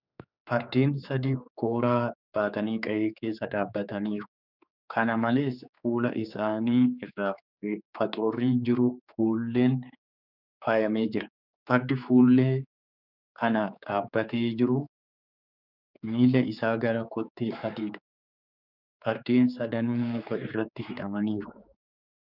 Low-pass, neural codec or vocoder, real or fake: 5.4 kHz; codec, 16 kHz, 4 kbps, X-Codec, HuBERT features, trained on general audio; fake